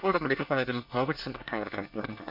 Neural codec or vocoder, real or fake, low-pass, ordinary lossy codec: codec, 24 kHz, 1 kbps, SNAC; fake; 5.4 kHz; MP3, 48 kbps